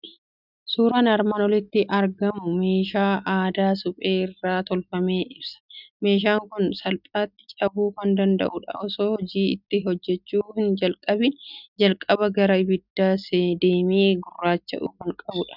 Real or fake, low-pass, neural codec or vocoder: real; 5.4 kHz; none